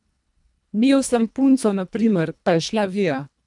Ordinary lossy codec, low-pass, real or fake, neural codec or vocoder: none; 10.8 kHz; fake; codec, 24 kHz, 1.5 kbps, HILCodec